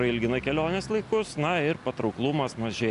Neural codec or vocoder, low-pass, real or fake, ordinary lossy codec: none; 10.8 kHz; real; AAC, 64 kbps